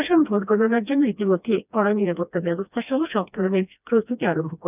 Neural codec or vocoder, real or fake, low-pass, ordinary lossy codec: codec, 16 kHz, 1 kbps, FreqCodec, smaller model; fake; 3.6 kHz; none